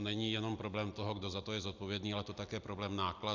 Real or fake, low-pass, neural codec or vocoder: real; 7.2 kHz; none